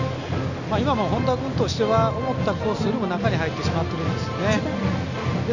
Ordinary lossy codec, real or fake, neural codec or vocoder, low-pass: none; real; none; 7.2 kHz